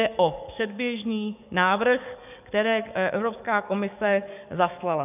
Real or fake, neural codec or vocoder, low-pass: fake; codec, 44.1 kHz, 7.8 kbps, DAC; 3.6 kHz